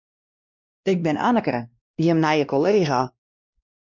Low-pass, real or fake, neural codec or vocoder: 7.2 kHz; fake; codec, 16 kHz, 1 kbps, X-Codec, WavLM features, trained on Multilingual LibriSpeech